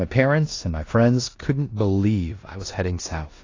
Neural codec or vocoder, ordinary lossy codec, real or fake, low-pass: codec, 16 kHz in and 24 kHz out, 0.9 kbps, LongCat-Audio-Codec, four codebook decoder; AAC, 32 kbps; fake; 7.2 kHz